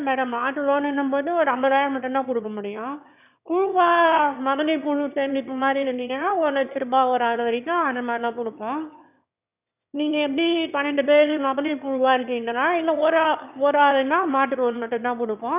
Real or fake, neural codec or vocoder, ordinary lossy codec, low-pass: fake; autoencoder, 22.05 kHz, a latent of 192 numbers a frame, VITS, trained on one speaker; none; 3.6 kHz